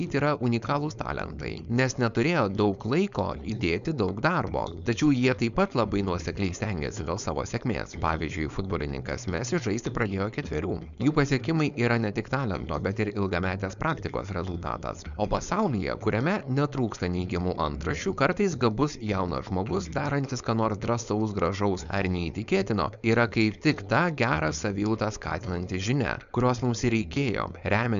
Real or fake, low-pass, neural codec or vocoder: fake; 7.2 kHz; codec, 16 kHz, 4.8 kbps, FACodec